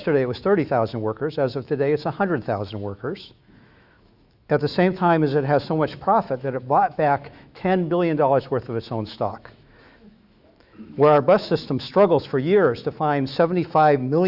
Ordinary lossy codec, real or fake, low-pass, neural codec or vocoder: Opus, 64 kbps; fake; 5.4 kHz; autoencoder, 48 kHz, 128 numbers a frame, DAC-VAE, trained on Japanese speech